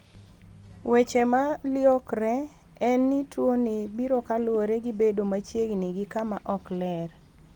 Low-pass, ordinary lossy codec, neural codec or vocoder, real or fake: 19.8 kHz; Opus, 24 kbps; none; real